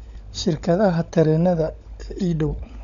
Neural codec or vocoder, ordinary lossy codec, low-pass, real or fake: codec, 16 kHz, 16 kbps, FunCodec, trained on LibriTTS, 50 frames a second; none; 7.2 kHz; fake